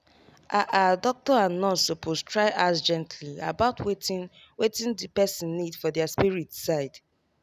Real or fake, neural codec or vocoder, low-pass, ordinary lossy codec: real; none; 14.4 kHz; none